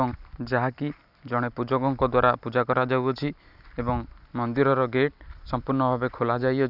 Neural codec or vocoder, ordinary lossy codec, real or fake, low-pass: none; none; real; 5.4 kHz